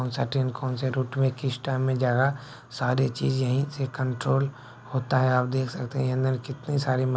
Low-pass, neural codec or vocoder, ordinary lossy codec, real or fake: none; none; none; real